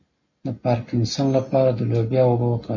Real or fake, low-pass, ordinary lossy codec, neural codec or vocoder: real; 7.2 kHz; MP3, 48 kbps; none